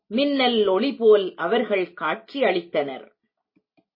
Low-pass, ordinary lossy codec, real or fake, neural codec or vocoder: 5.4 kHz; MP3, 24 kbps; real; none